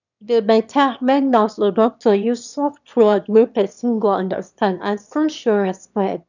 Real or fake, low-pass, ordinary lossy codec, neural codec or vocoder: fake; 7.2 kHz; none; autoencoder, 22.05 kHz, a latent of 192 numbers a frame, VITS, trained on one speaker